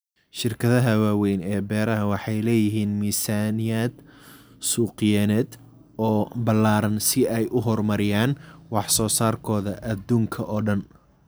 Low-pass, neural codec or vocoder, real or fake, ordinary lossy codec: none; none; real; none